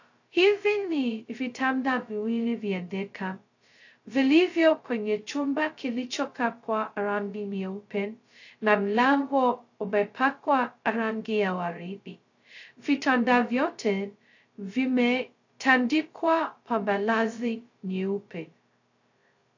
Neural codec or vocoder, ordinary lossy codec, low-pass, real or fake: codec, 16 kHz, 0.2 kbps, FocalCodec; AAC, 48 kbps; 7.2 kHz; fake